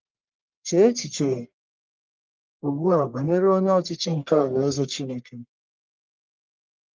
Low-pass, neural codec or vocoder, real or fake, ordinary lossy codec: 7.2 kHz; codec, 44.1 kHz, 1.7 kbps, Pupu-Codec; fake; Opus, 32 kbps